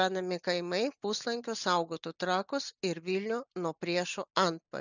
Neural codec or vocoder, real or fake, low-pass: none; real; 7.2 kHz